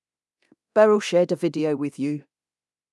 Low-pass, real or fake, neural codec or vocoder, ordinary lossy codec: none; fake; codec, 24 kHz, 0.9 kbps, DualCodec; none